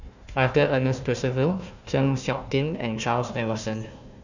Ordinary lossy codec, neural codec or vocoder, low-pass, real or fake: none; codec, 16 kHz, 1 kbps, FunCodec, trained on Chinese and English, 50 frames a second; 7.2 kHz; fake